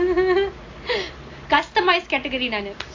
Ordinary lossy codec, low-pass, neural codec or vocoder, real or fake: AAC, 48 kbps; 7.2 kHz; none; real